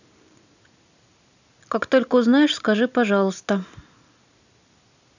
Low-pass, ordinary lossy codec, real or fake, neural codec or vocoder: 7.2 kHz; none; real; none